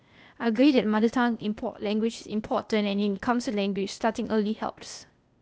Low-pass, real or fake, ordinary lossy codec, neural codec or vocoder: none; fake; none; codec, 16 kHz, 0.8 kbps, ZipCodec